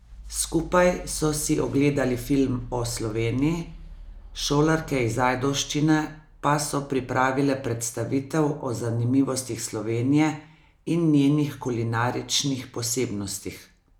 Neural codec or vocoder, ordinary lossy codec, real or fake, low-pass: none; none; real; 19.8 kHz